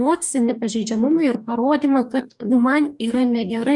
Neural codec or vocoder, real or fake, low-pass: codec, 44.1 kHz, 2.6 kbps, DAC; fake; 10.8 kHz